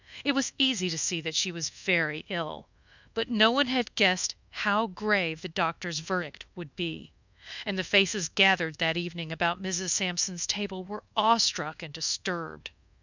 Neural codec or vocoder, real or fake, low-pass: codec, 24 kHz, 1.2 kbps, DualCodec; fake; 7.2 kHz